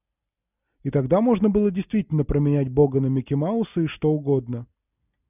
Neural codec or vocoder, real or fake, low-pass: none; real; 3.6 kHz